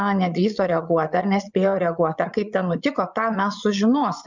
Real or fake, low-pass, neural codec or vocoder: fake; 7.2 kHz; vocoder, 22.05 kHz, 80 mel bands, Vocos